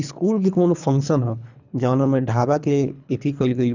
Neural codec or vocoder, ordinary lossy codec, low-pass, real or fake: codec, 24 kHz, 3 kbps, HILCodec; none; 7.2 kHz; fake